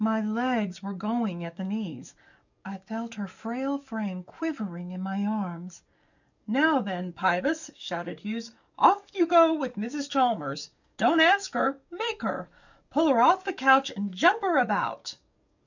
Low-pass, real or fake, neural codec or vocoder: 7.2 kHz; fake; codec, 44.1 kHz, 7.8 kbps, DAC